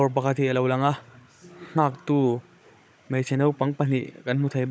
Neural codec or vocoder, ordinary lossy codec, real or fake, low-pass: codec, 16 kHz, 16 kbps, FunCodec, trained on Chinese and English, 50 frames a second; none; fake; none